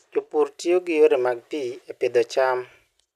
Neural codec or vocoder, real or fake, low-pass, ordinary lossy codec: none; real; 14.4 kHz; none